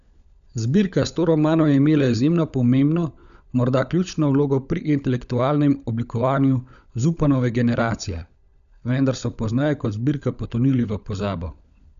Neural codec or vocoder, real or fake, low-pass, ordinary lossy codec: codec, 16 kHz, 16 kbps, FunCodec, trained on LibriTTS, 50 frames a second; fake; 7.2 kHz; none